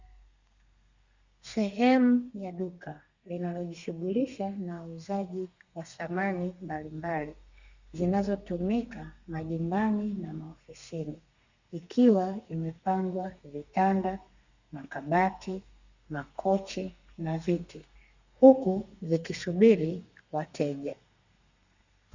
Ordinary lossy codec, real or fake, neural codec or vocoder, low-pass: Opus, 64 kbps; fake; codec, 32 kHz, 1.9 kbps, SNAC; 7.2 kHz